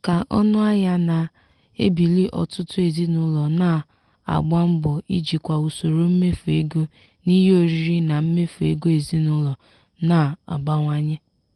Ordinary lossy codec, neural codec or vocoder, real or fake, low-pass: Opus, 24 kbps; none; real; 10.8 kHz